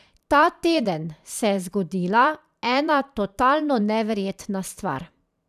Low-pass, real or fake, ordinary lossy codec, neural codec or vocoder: 14.4 kHz; fake; none; vocoder, 44.1 kHz, 128 mel bands every 512 samples, BigVGAN v2